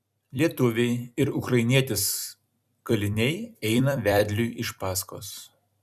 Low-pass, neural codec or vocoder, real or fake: 14.4 kHz; vocoder, 44.1 kHz, 128 mel bands every 256 samples, BigVGAN v2; fake